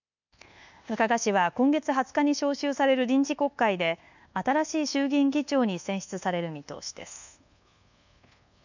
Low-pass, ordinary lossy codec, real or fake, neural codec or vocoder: 7.2 kHz; none; fake; codec, 24 kHz, 1.2 kbps, DualCodec